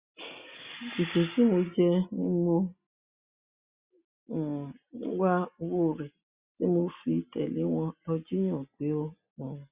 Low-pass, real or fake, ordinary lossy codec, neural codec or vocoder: 3.6 kHz; real; Opus, 64 kbps; none